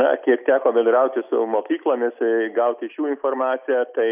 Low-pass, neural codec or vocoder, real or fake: 3.6 kHz; none; real